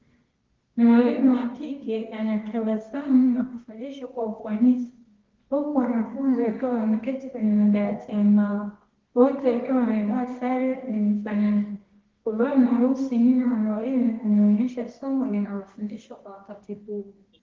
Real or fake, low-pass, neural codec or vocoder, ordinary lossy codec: fake; 7.2 kHz; codec, 24 kHz, 0.9 kbps, WavTokenizer, medium music audio release; Opus, 16 kbps